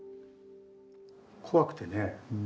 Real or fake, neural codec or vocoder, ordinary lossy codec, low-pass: real; none; none; none